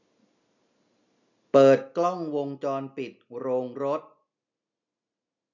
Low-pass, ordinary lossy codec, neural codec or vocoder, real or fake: 7.2 kHz; none; none; real